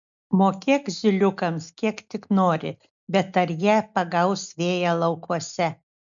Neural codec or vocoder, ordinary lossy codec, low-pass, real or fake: none; MP3, 96 kbps; 7.2 kHz; real